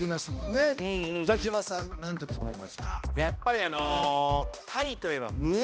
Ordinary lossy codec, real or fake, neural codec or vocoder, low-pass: none; fake; codec, 16 kHz, 1 kbps, X-Codec, HuBERT features, trained on balanced general audio; none